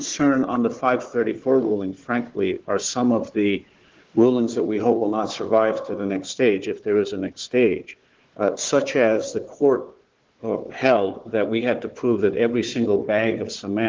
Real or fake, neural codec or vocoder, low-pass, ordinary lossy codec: fake; codec, 16 kHz, 4 kbps, FunCodec, trained on Chinese and English, 50 frames a second; 7.2 kHz; Opus, 16 kbps